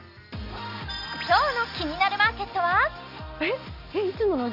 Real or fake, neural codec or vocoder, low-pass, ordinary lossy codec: real; none; 5.4 kHz; none